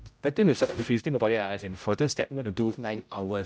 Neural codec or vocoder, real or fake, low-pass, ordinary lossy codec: codec, 16 kHz, 0.5 kbps, X-Codec, HuBERT features, trained on general audio; fake; none; none